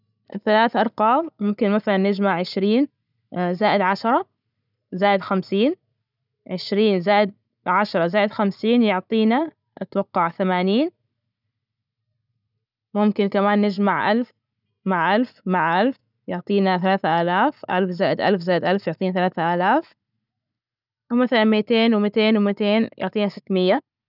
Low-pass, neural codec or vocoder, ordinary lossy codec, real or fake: 5.4 kHz; none; none; real